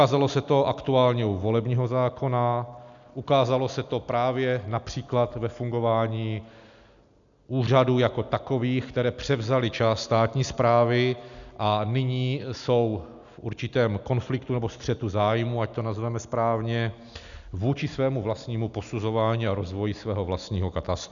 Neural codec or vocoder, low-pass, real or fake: none; 7.2 kHz; real